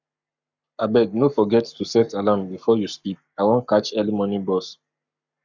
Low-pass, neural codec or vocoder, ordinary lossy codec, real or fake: 7.2 kHz; codec, 44.1 kHz, 7.8 kbps, Pupu-Codec; none; fake